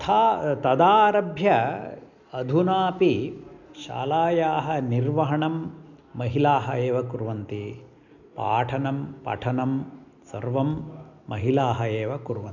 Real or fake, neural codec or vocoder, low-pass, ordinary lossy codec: real; none; 7.2 kHz; none